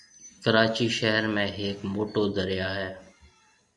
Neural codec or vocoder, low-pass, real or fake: none; 10.8 kHz; real